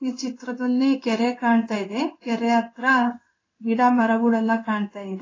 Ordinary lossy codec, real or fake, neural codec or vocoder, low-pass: AAC, 32 kbps; fake; codec, 16 kHz in and 24 kHz out, 1 kbps, XY-Tokenizer; 7.2 kHz